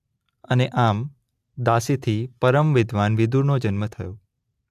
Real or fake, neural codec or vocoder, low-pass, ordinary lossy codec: real; none; 14.4 kHz; AAC, 96 kbps